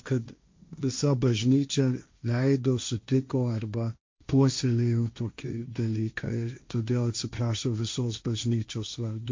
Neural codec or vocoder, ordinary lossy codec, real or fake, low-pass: codec, 16 kHz, 1.1 kbps, Voila-Tokenizer; MP3, 48 kbps; fake; 7.2 kHz